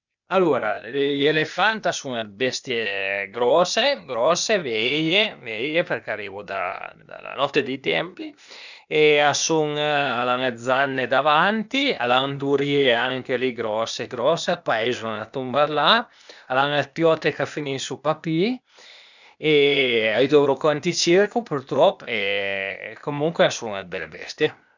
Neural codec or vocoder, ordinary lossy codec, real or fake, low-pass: codec, 16 kHz, 0.8 kbps, ZipCodec; none; fake; 7.2 kHz